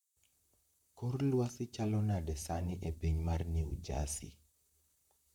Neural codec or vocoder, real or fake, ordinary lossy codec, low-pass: vocoder, 44.1 kHz, 128 mel bands, Pupu-Vocoder; fake; none; 19.8 kHz